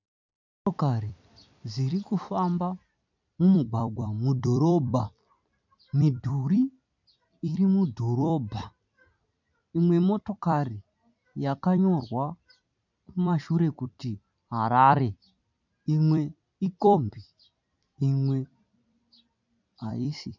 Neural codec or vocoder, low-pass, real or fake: vocoder, 44.1 kHz, 128 mel bands every 256 samples, BigVGAN v2; 7.2 kHz; fake